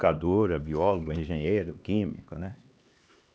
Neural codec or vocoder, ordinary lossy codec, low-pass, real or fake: codec, 16 kHz, 2 kbps, X-Codec, HuBERT features, trained on LibriSpeech; none; none; fake